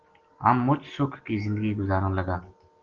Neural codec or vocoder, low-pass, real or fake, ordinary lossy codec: none; 7.2 kHz; real; Opus, 16 kbps